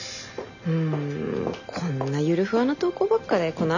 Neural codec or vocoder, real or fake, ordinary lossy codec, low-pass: none; real; none; 7.2 kHz